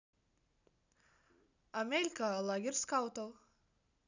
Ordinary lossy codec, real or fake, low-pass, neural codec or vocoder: none; real; 7.2 kHz; none